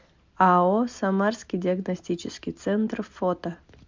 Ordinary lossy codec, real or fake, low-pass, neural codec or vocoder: MP3, 64 kbps; real; 7.2 kHz; none